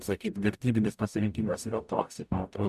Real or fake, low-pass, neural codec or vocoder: fake; 14.4 kHz; codec, 44.1 kHz, 0.9 kbps, DAC